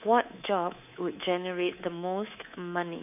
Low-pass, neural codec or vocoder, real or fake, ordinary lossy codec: 3.6 kHz; codec, 24 kHz, 3.1 kbps, DualCodec; fake; none